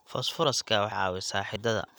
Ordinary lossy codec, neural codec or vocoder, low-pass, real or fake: none; none; none; real